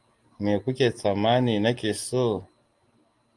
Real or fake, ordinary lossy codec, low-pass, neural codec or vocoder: real; Opus, 32 kbps; 10.8 kHz; none